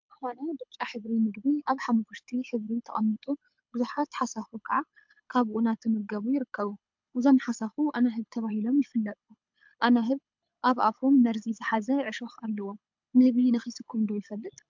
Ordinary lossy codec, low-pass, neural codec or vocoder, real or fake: MP3, 64 kbps; 7.2 kHz; codec, 24 kHz, 6 kbps, HILCodec; fake